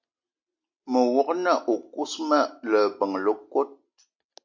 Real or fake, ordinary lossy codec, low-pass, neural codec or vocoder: real; MP3, 64 kbps; 7.2 kHz; none